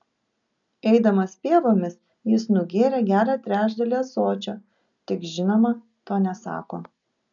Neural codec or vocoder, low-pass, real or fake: none; 7.2 kHz; real